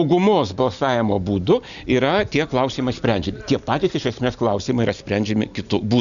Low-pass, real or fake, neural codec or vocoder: 7.2 kHz; real; none